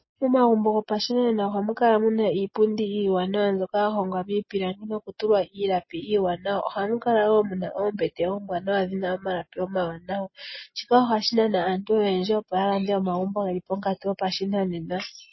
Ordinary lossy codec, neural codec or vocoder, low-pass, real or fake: MP3, 24 kbps; none; 7.2 kHz; real